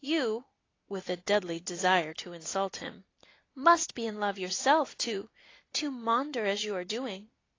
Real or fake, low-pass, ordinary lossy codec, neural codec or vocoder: real; 7.2 kHz; AAC, 32 kbps; none